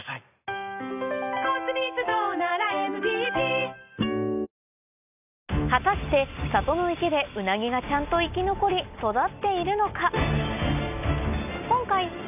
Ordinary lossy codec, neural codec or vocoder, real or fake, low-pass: none; none; real; 3.6 kHz